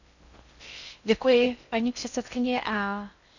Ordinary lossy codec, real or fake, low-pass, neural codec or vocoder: none; fake; 7.2 kHz; codec, 16 kHz in and 24 kHz out, 0.6 kbps, FocalCodec, streaming, 2048 codes